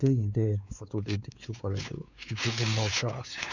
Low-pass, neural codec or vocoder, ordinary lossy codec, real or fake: 7.2 kHz; codec, 16 kHz, 4 kbps, X-Codec, WavLM features, trained on Multilingual LibriSpeech; none; fake